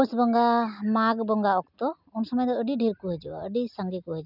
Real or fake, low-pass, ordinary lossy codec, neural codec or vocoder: real; 5.4 kHz; none; none